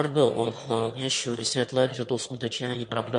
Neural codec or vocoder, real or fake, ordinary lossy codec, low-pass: autoencoder, 22.05 kHz, a latent of 192 numbers a frame, VITS, trained on one speaker; fake; MP3, 48 kbps; 9.9 kHz